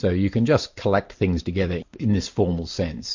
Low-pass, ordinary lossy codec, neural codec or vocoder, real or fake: 7.2 kHz; MP3, 48 kbps; none; real